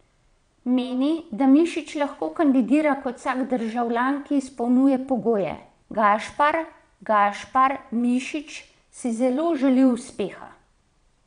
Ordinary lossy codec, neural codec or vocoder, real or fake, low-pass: none; vocoder, 22.05 kHz, 80 mel bands, Vocos; fake; 9.9 kHz